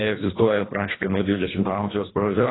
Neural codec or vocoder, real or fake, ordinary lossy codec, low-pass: codec, 24 kHz, 1.5 kbps, HILCodec; fake; AAC, 16 kbps; 7.2 kHz